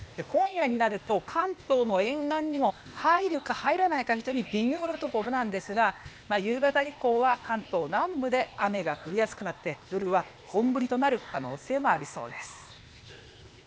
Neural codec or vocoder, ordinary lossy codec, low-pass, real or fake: codec, 16 kHz, 0.8 kbps, ZipCodec; none; none; fake